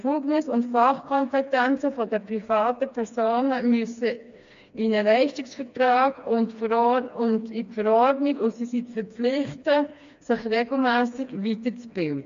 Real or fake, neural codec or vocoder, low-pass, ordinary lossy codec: fake; codec, 16 kHz, 2 kbps, FreqCodec, smaller model; 7.2 kHz; AAC, 64 kbps